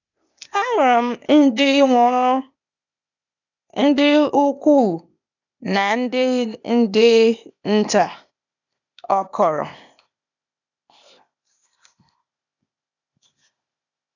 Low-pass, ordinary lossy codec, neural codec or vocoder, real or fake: 7.2 kHz; none; codec, 16 kHz, 0.8 kbps, ZipCodec; fake